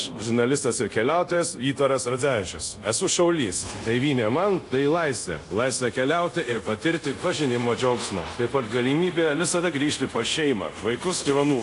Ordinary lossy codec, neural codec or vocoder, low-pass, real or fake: AAC, 48 kbps; codec, 24 kHz, 0.5 kbps, DualCodec; 10.8 kHz; fake